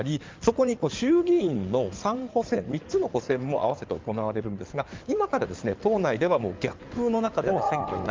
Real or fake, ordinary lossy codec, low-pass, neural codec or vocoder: fake; Opus, 24 kbps; 7.2 kHz; codec, 16 kHz in and 24 kHz out, 2.2 kbps, FireRedTTS-2 codec